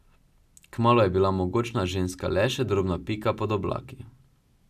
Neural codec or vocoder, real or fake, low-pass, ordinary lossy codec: none; real; 14.4 kHz; none